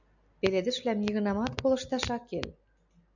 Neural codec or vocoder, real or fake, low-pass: none; real; 7.2 kHz